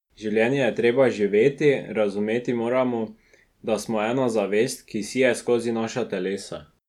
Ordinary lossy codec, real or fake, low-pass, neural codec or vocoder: none; real; 19.8 kHz; none